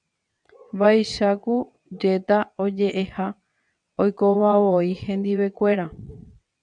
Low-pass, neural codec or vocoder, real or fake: 9.9 kHz; vocoder, 22.05 kHz, 80 mel bands, WaveNeXt; fake